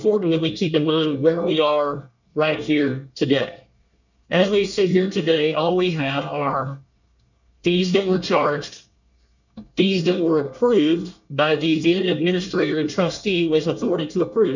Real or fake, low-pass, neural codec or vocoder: fake; 7.2 kHz; codec, 24 kHz, 1 kbps, SNAC